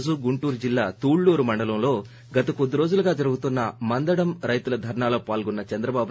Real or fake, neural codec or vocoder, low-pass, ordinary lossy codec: real; none; none; none